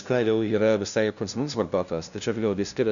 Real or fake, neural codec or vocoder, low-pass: fake; codec, 16 kHz, 0.5 kbps, FunCodec, trained on LibriTTS, 25 frames a second; 7.2 kHz